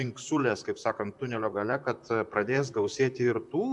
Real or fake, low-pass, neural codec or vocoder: fake; 10.8 kHz; vocoder, 44.1 kHz, 128 mel bands, Pupu-Vocoder